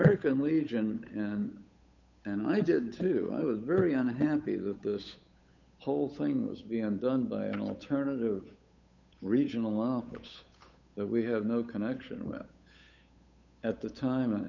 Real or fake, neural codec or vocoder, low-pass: fake; codec, 16 kHz, 8 kbps, FunCodec, trained on Chinese and English, 25 frames a second; 7.2 kHz